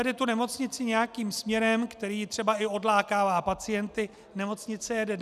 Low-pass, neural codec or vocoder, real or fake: 14.4 kHz; none; real